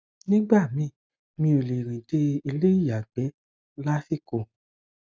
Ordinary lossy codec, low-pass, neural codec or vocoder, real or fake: none; none; none; real